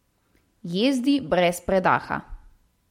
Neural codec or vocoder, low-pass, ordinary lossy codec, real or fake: none; 19.8 kHz; MP3, 64 kbps; real